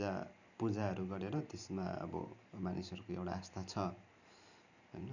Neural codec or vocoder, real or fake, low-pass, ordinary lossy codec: vocoder, 44.1 kHz, 128 mel bands every 512 samples, BigVGAN v2; fake; 7.2 kHz; none